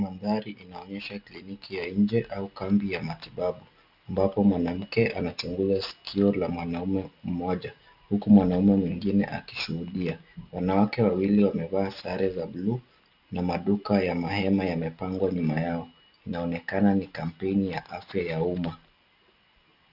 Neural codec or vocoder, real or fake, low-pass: none; real; 5.4 kHz